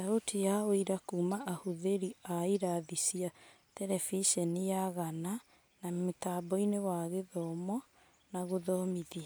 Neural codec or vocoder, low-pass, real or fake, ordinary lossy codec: none; none; real; none